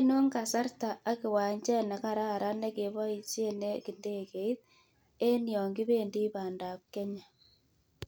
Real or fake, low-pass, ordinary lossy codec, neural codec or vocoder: real; none; none; none